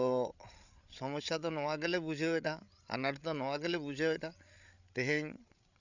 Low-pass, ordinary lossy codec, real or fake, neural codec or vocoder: 7.2 kHz; none; fake; codec, 16 kHz, 8 kbps, FreqCodec, larger model